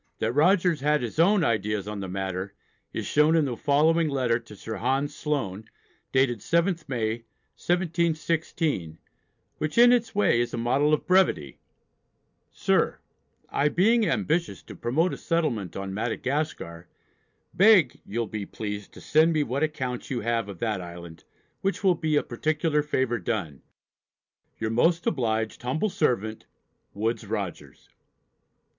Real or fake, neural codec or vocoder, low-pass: real; none; 7.2 kHz